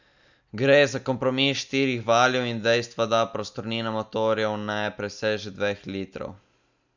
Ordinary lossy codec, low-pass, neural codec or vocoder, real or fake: none; 7.2 kHz; none; real